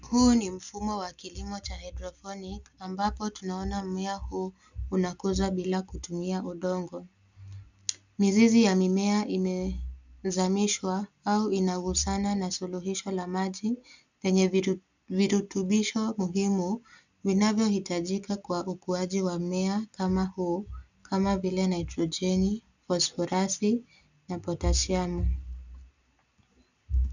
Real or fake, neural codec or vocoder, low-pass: real; none; 7.2 kHz